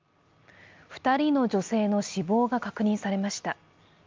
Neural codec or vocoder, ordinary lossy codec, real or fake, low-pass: none; Opus, 24 kbps; real; 7.2 kHz